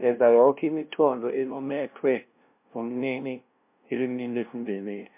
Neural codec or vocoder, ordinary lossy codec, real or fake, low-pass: codec, 16 kHz, 0.5 kbps, FunCodec, trained on LibriTTS, 25 frames a second; MP3, 32 kbps; fake; 3.6 kHz